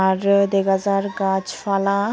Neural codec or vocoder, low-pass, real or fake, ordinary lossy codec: none; none; real; none